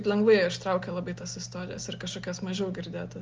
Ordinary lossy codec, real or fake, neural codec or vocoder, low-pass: Opus, 32 kbps; real; none; 7.2 kHz